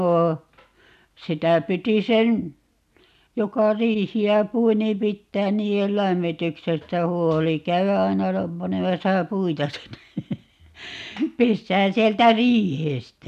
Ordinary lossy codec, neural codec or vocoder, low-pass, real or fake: none; none; 14.4 kHz; real